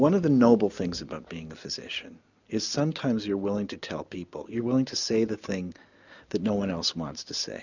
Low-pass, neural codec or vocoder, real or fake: 7.2 kHz; none; real